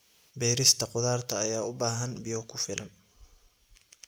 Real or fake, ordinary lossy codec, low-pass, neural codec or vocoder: real; none; none; none